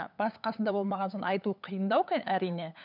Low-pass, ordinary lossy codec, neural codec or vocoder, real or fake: 5.4 kHz; none; codec, 16 kHz, 8 kbps, FunCodec, trained on LibriTTS, 25 frames a second; fake